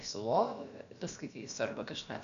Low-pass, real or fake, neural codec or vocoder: 7.2 kHz; fake; codec, 16 kHz, about 1 kbps, DyCAST, with the encoder's durations